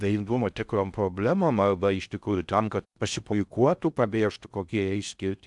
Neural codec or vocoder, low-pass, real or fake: codec, 16 kHz in and 24 kHz out, 0.6 kbps, FocalCodec, streaming, 2048 codes; 10.8 kHz; fake